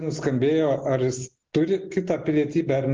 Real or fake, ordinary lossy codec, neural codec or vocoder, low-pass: real; Opus, 16 kbps; none; 7.2 kHz